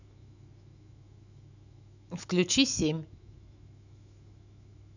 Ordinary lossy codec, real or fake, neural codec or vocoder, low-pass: none; fake; autoencoder, 48 kHz, 128 numbers a frame, DAC-VAE, trained on Japanese speech; 7.2 kHz